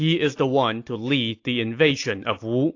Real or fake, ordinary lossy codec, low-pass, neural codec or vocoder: real; AAC, 48 kbps; 7.2 kHz; none